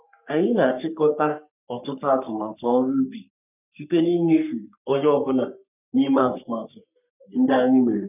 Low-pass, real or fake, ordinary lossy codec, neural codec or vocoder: 3.6 kHz; fake; none; codec, 44.1 kHz, 3.4 kbps, Pupu-Codec